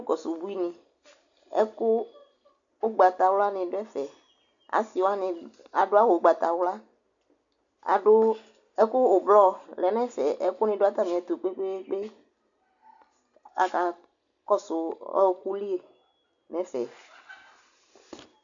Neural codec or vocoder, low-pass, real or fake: none; 7.2 kHz; real